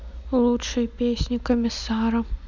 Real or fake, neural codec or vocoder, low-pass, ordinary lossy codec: real; none; 7.2 kHz; none